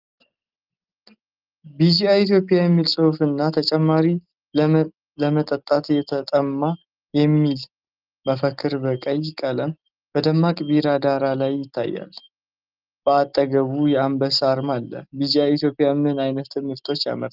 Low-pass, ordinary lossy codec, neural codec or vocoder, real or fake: 5.4 kHz; Opus, 32 kbps; none; real